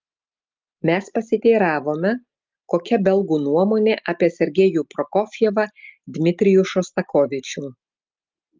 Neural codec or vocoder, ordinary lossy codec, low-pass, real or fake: none; Opus, 24 kbps; 7.2 kHz; real